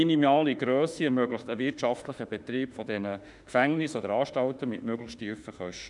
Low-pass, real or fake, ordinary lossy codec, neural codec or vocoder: 10.8 kHz; fake; none; autoencoder, 48 kHz, 32 numbers a frame, DAC-VAE, trained on Japanese speech